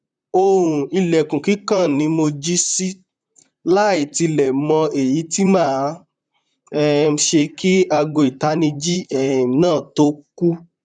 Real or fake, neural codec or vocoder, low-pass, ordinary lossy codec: fake; vocoder, 44.1 kHz, 128 mel bands, Pupu-Vocoder; 9.9 kHz; none